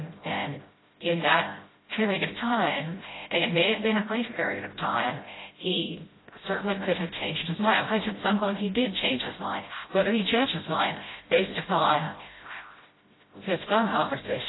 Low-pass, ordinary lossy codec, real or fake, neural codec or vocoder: 7.2 kHz; AAC, 16 kbps; fake; codec, 16 kHz, 0.5 kbps, FreqCodec, smaller model